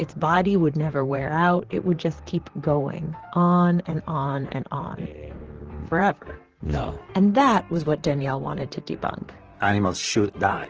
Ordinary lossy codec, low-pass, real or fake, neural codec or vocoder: Opus, 16 kbps; 7.2 kHz; fake; vocoder, 44.1 kHz, 128 mel bands, Pupu-Vocoder